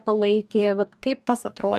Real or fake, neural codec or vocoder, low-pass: fake; codec, 44.1 kHz, 2.6 kbps, DAC; 14.4 kHz